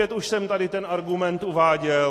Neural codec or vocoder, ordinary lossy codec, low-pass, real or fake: none; AAC, 48 kbps; 14.4 kHz; real